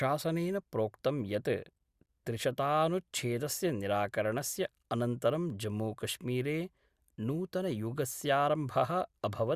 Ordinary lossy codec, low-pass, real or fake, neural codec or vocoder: Opus, 64 kbps; 14.4 kHz; real; none